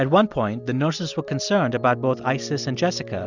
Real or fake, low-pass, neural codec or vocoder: real; 7.2 kHz; none